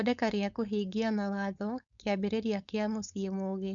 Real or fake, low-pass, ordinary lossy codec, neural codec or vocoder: fake; 7.2 kHz; none; codec, 16 kHz, 4.8 kbps, FACodec